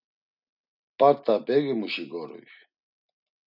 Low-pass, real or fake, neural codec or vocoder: 5.4 kHz; real; none